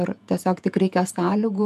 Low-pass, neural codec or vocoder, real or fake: 14.4 kHz; vocoder, 48 kHz, 128 mel bands, Vocos; fake